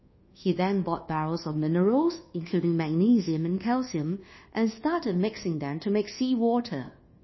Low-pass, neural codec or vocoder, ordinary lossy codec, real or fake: 7.2 kHz; codec, 24 kHz, 1.2 kbps, DualCodec; MP3, 24 kbps; fake